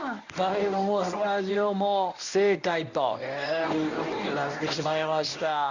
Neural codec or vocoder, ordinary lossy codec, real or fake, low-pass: codec, 24 kHz, 0.9 kbps, WavTokenizer, medium speech release version 1; none; fake; 7.2 kHz